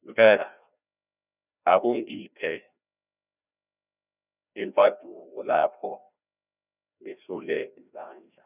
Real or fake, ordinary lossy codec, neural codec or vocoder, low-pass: fake; none; codec, 16 kHz, 1 kbps, FreqCodec, larger model; 3.6 kHz